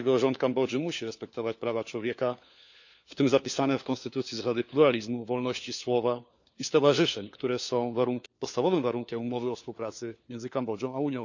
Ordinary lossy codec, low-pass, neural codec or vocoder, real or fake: none; 7.2 kHz; codec, 16 kHz, 4 kbps, FunCodec, trained on LibriTTS, 50 frames a second; fake